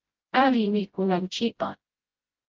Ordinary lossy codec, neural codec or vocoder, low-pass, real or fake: Opus, 32 kbps; codec, 16 kHz, 0.5 kbps, FreqCodec, smaller model; 7.2 kHz; fake